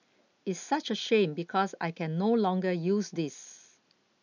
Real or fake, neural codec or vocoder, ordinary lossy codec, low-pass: real; none; none; 7.2 kHz